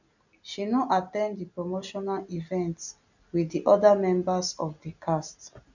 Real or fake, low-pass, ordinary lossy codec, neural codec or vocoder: real; 7.2 kHz; none; none